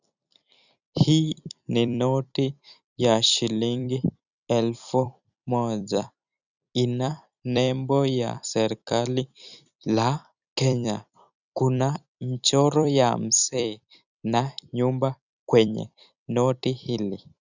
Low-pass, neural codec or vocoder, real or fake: 7.2 kHz; vocoder, 44.1 kHz, 128 mel bands every 256 samples, BigVGAN v2; fake